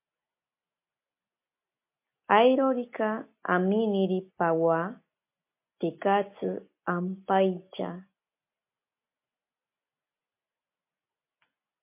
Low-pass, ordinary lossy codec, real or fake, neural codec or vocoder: 3.6 kHz; MP3, 24 kbps; real; none